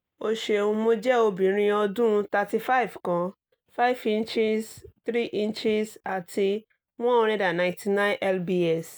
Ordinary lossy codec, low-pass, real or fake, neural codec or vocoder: none; none; real; none